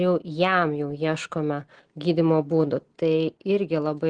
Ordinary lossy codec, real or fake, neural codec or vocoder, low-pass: Opus, 16 kbps; real; none; 9.9 kHz